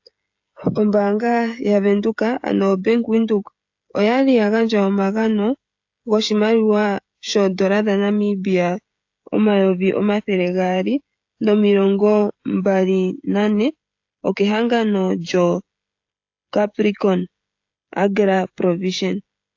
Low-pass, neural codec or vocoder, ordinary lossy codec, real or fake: 7.2 kHz; codec, 16 kHz, 16 kbps, FreqCodec, smaller model; AAC, 48 kbps; fake